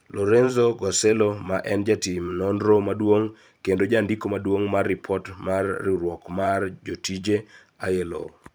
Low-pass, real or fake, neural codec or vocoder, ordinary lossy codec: none; fake; vocoder, 44.1 kHz, 128 mel bands every 512 samples, BigVGAN v2; none